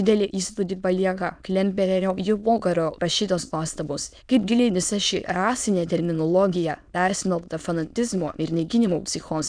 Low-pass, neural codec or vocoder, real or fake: 9.9 kHz; autoencoder, 22.05 kHz, a latent of 192 numbers a frame, VITS, trained on many speakers; fake